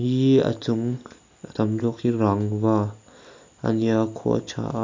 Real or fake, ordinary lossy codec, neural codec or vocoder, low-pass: real; MP3, 48 kbps; none; 7.2 kHz